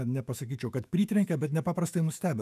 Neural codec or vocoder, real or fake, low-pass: autoencoder, 48 kHz, 128 numbers a frame, DAC-VAE, trained on Japanese speech; fake; 14.4 kHz